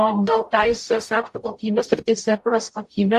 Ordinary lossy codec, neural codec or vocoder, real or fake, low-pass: AAC, 64 kbps; codec, 44.1 kHz, 0.9 kbps, DAC; fake; 14.4 kHz